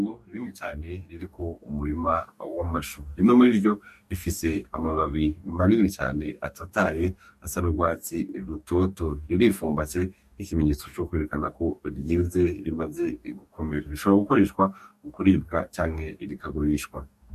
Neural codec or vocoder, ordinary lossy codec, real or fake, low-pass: codec, 44.1 kHz, 2.6 kbps, DAC; MP3, 64 kbps; fake; 14.4 kHz